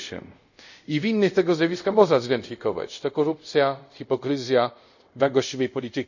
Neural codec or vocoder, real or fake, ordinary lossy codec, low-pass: codec, 24 kHz, 0.5 kbps, DualCodec; fake; none; 7.2 kHz